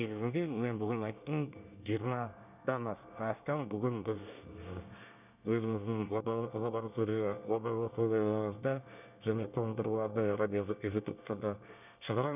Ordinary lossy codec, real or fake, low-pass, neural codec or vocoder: none; fake; 3.6 kHz; codec, 24 kHz, 1 kbps, SNAC